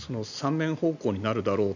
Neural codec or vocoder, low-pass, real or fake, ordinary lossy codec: none; 7.2 kHz; real; none